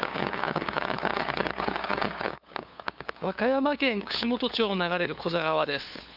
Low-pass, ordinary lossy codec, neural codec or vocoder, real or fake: 5.4 kHz; none; codec, 16 kHz, 2 kbps, FunCodec, trained on LibriTTS, 25 frames a second; fake